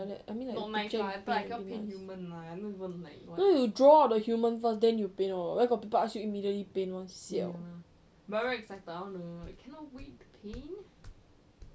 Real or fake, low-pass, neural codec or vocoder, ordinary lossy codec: real; none; none; none